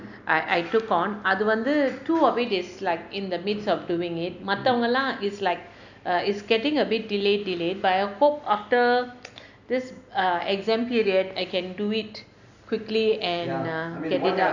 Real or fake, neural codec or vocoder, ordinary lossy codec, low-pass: real; none; none; 7.2 kHz